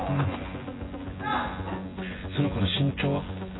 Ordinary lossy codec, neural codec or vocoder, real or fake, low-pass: AAC, 16 kbps; vocoder, 24 kHz, 100 mel bands, Vocos; fake; 7.2 kHz